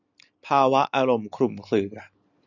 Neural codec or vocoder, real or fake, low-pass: none; real; 7.2 kHz